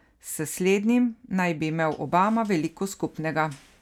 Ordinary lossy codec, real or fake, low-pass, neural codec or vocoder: none; real; 19.8 kHz; none